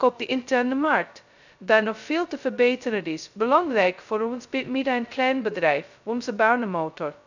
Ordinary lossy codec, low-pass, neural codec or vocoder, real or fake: none; 7.2 kHz; codec, 16 kHz, 0.2 kbps, FocalCodec; fake